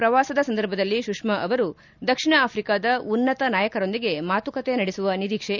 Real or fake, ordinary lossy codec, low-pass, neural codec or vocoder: real; none; 7.2 kHz; none